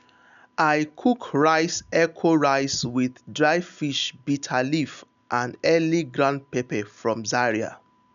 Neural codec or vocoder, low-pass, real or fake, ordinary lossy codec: none; 7.2 kHz; real; none